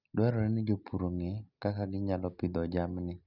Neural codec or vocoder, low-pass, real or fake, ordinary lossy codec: none; 5.4 kHz; real; none